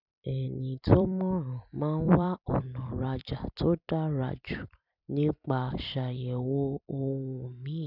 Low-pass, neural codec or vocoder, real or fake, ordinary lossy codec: 5.4 kHz; vocoder, 44.1 kHz, 128 mel bands every 256 samples, BigVGAN v2; fake; none